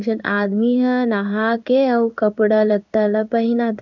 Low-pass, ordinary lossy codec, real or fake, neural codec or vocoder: 7.2 kHz; none; fake; codec, 16 kHz in and 24 kHz out, 1 kbps, XY-Tokenizer